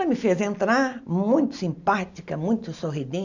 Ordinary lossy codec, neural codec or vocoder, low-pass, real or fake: none; none; 7.2 kHz; real